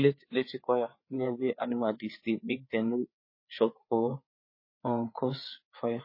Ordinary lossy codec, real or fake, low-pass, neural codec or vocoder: MP3, 24 kbps; fake; 5.4 kHz; codec, 16 kHz, 4 kbps, FreqCodec, larger model